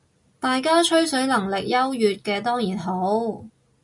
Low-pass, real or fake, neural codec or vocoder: 10.8 kHz; real; none